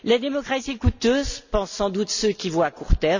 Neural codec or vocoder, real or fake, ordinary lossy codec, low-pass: none; real; none; 7.2 kHz